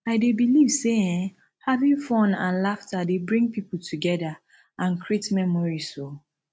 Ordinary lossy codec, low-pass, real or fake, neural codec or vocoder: none; none; real; none